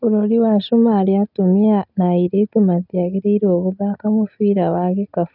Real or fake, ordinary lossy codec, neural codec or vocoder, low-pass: real; none; none; 5.4 kHz